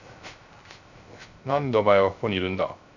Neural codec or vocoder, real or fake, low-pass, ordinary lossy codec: codec, 16 kHz, 0.3 kbps, FocalCodec; fake; 7.2 kHz; none